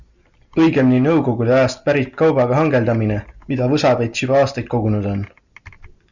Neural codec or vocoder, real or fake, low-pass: none; real; 7.2 kHz